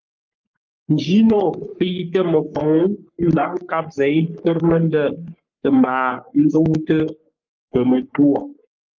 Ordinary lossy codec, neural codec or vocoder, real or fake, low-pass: Opus, 24 kbps; codec, 44.1 kHz, 3.4 kbps, Pupu-Codec; fake; 7.2 kHz